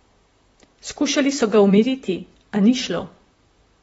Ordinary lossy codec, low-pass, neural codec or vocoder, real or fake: AAC, 24 kbps; 19.8 kHz; vocoder, 44.1 kHz, 128 mel bands, Pupu-Vocoder; fake